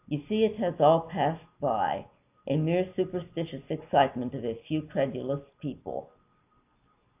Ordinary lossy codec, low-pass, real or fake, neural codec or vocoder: AAC, 32 kbps; 3.6 kHz; real; none